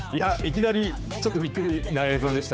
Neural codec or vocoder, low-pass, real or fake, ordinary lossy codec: codec, 16 kHz, 4 kbps, X-Codec, HuBERT features, trained on general audio; none; fake; none